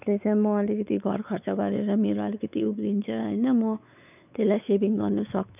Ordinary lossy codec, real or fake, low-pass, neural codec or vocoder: none; fake; 3.6 kHz; codec, 16 kHz, 4 kbps, X-Codec, WavLM features, trained on Multilingual LibriSpeech